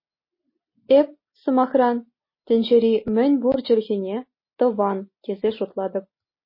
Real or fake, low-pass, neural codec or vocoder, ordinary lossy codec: real; 5.4 kHz; none; MP3, 24 kbps